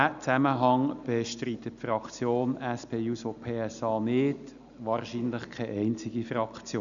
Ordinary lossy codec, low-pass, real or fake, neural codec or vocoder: none; 7.2 kHz; real; none